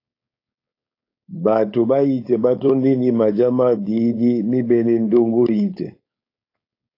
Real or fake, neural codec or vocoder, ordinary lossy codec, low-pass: fake; codec, 16 kHz, 4.8 kbps, FACodec; AAC, 32 kbps; 5.4 kHz